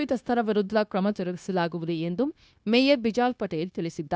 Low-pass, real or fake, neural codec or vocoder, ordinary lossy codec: none; fake; codec, 16 kHz, 0.9 kbps, LongCat-Audio-Codec; none